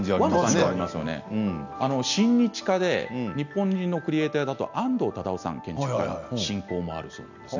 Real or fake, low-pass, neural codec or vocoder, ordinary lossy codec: real; 7.2 kHz; none; none